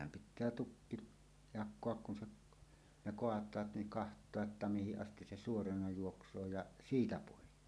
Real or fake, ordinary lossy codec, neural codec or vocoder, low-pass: real; none; none; none